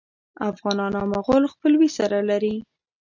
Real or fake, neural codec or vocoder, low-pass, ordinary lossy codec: real; none; 7.2 kHz; MP3, 64 kbps